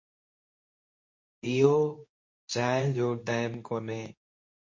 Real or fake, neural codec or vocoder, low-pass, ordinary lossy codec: fake; codec, 24 kHz, 0.9 kbps, WavTokenizer, medium speech release version 2; 7.2 kHz; MP3, 32 kbps